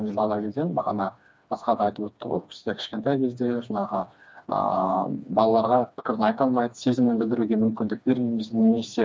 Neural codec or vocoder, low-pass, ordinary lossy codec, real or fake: codec, 16 kHz, 2 kbps, FreqCodec, smaller model; none; none; fake